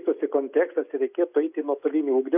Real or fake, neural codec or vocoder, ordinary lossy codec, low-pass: real; none; AAC, 32 kbps; 3.6 kHz